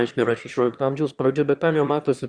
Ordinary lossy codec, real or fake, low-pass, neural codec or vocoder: Opus, 64 kbps; fake; 9.9 kHz; autoencoder, 22.05 kHz, a latent of 192 numbers a frame, VITS, trained on one speaker